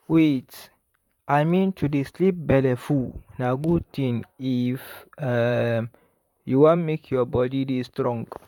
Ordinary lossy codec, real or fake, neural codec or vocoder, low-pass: none; fake; vocoder, 44.1 kHz, 128 mel bands, Pupu-Vocoder; 19.8 kHz